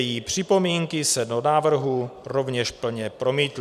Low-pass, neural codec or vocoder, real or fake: 14.4 kHz; vocoder, 44.1 kHz, 128 mel bands every 256 samples, BigVGAN v2; fake